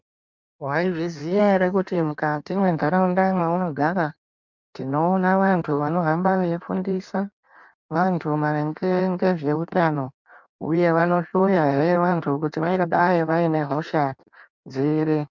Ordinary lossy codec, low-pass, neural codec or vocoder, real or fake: MP3, 64 kbps; 7.2 kHz; codec, 16 kHz in and 24 kHz out, 1.1 kbps, FireRedTTS-2 codec; fake